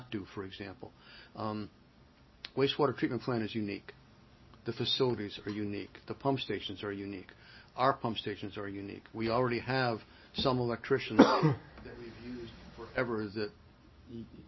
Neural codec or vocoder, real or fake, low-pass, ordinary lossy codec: none; real; 7.2 kHz; MP3, 24 kbps